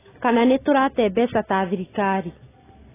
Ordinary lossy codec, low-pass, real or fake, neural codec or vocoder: AAC, 16 kbps; 3.6 kHz; real; none